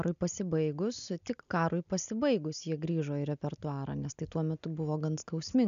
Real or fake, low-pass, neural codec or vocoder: real; 7.2 kHz; none